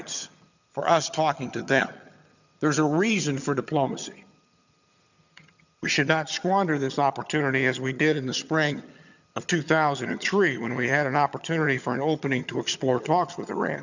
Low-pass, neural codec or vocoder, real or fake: 7.2 kHz; vocoder, 22.05 kHz, 80 mel bands, HiFi-GAN; fake